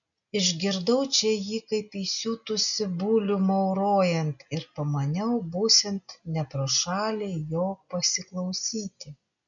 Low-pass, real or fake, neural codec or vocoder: 7.2 kHz; real; none